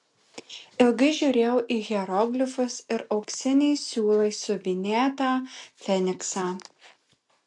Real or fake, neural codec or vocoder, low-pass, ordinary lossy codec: real; none; 10.8 kHz; AAC, 64 kbps